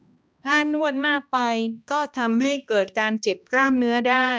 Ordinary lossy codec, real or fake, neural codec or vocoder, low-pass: none; fake; codec, 16 kHz, 1 kbps, X-Codec, HuBERT features, trained on balanced general audio; none